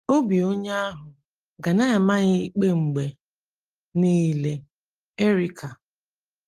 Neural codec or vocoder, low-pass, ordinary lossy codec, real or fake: none; 14.4 kHz; Opus, 24 kbps; real